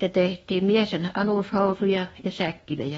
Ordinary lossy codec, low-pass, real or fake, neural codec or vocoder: AAC, 24 kbps; 10.8 kHz; fake; codec, 24 kHz, 1.2 kbps, DualCodec